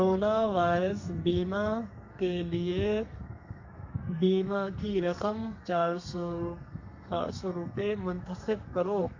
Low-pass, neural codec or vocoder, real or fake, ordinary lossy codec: 7.2 kHz; codec, 32 kHz, 1.9 kbps, SNAC; fake; MP3, 48 kbps